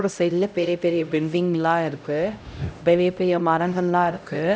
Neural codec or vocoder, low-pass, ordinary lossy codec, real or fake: codec, 16 kHz, 0.5 kbps, X-Codec, HuBERT features, trained on LibriSpeech; none; none; fake